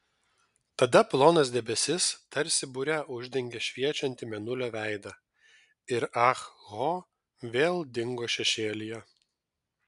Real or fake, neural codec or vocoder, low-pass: real; none; 10.8 kHz